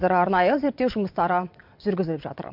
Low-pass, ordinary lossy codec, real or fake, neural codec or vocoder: 5.4 kHz; MP3, 48 kbps; fake; vocoder, 22.05 kHz, 80 mel bands, WaveNeXt